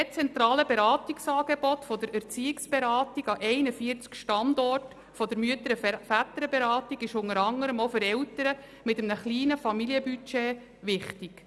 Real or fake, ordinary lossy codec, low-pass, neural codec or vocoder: real; none; none; none